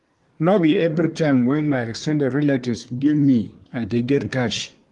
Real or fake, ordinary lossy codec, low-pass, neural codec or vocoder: fake; Opus, 24 kbps; 10.8 kHz; codec, 24 kHz, 1 kbps, SNAC